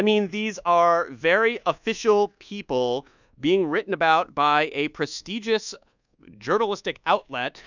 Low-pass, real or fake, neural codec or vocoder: 7.2 kHz; fake; codec, 24 kHz, 1.2 kbps, DualCodec